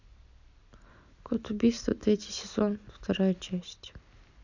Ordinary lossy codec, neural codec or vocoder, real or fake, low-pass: none; none; real; 7.2 kHz